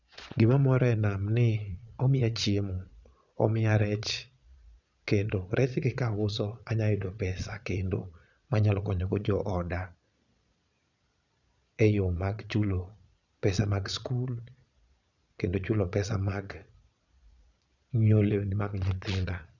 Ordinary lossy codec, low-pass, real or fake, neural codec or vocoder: none; 7.2 kHz; fake; vocoder, 22.05 kHz, 80 mel bands, Vocos